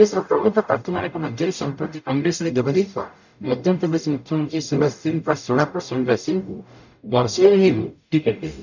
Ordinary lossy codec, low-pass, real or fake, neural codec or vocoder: none; 7.2 kHz; fake; codec, 44.1 kHz, 0.9 kbps, DAC